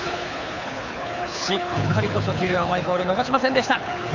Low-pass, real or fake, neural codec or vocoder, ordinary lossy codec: 7.2 kHz; fake; codec, 24 kHz, 6 kbps, HILCodec; none